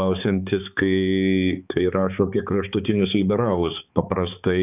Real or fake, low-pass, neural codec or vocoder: fake; 3.6 kHz; codec, 16 kHz, 4 kbps, X-Codec, HuBERT features, trained on balanced general audio